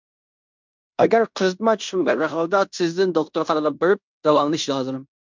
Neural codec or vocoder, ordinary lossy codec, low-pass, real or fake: codec, 16 kHz in and 24 kHz out, 0.9 kbps, LongCat-Audio-Codec, fine tuned four codebook decoder; MP3, 64 kbps; 7.2 kHz; fake